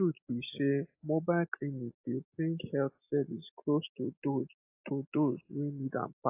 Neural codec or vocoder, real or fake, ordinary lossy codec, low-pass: none; real; AAC, 24 kbps; 3.6 kHz